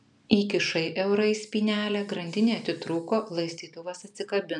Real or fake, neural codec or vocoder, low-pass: real; none; 10.8 kHz